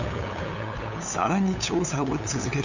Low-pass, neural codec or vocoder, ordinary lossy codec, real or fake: 7.2 kHz; codec, 16 kHz, 8 kbps, FunCodec, trained on LibriTTS, 25 frames a second; none; fake